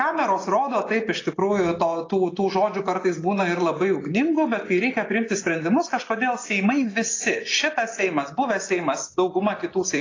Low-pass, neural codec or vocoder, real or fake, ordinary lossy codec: 7.2 kHz; none; real; AAC, 32 kbps